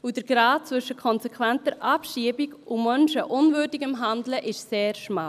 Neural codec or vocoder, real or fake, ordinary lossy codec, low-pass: none; real; none; 14.4 kHz